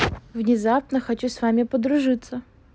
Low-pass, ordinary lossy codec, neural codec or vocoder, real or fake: none; none; none; real